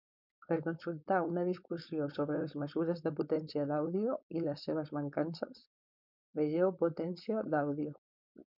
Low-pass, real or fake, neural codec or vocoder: 5.4 kHz; fake; codec, 16 kHz, 4.8 kbps, FACodec